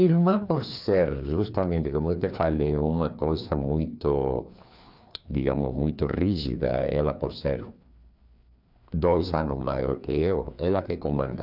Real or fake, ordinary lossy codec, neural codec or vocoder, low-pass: fake; none; codec, 16 kHz, 2 kbps, FreqCodec, larger model; 5.4 kHz